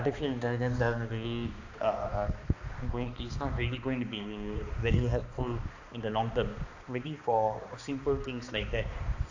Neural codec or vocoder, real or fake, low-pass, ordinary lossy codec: codec, 16 kHz, 2 kbps, X-Codec, HuBERT features, trained on balanced general audio; fake; 7.2 kHz; AAC, 48 kbps